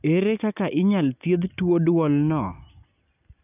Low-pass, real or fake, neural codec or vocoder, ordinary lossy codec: 3.6 kHz; real; none; none